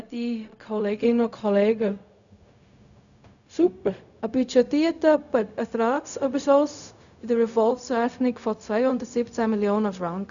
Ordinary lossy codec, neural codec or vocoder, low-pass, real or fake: none; codec, 16 kHz, 0.4 kbps, LongCat-Audio-Codec; 7.2 kHz; fake